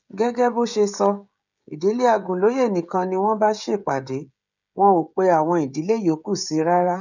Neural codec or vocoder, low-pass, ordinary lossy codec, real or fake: codec, 16 kHz, 16 kbps, FreqCodec, smaller model; 7.2 kHz; none; fake